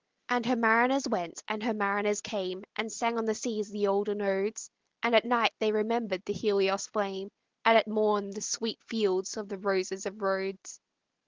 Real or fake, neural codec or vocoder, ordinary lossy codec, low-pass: real; none; Opus, 16 kbps; 7.2 kHz